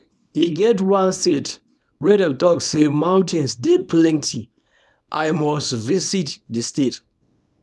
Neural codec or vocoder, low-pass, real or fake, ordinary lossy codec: codec, 24 kHz, 0.9 kbps, WavTokenizer, small release; none; fake; none